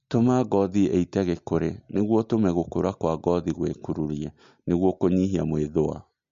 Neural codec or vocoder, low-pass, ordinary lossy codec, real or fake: none; 7.2 kHz; MP3, 48 kbps; real